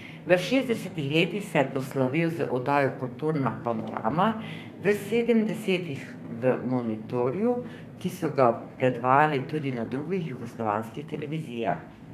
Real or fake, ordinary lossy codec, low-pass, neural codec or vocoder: fake; none; 14.4 kHz; codec, 32 kHz, 1.9 kbps, SNAC